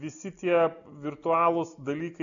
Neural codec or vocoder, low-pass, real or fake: none; 7.2 kHz; real